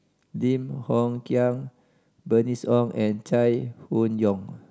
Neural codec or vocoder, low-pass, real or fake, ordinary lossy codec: none; none; real; none